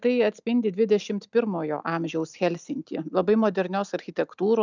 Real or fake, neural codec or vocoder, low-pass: real; none; 7.2 kHz